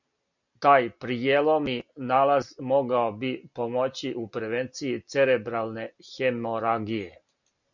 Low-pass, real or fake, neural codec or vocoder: 7.2 kHz; real; none